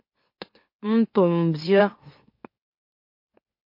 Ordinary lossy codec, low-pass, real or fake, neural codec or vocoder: MP3, 32 kbps; 5.4 kHz; fake; autoencoder, 44.1 kHz, a latent of 192 numbers a frame, MeloTTS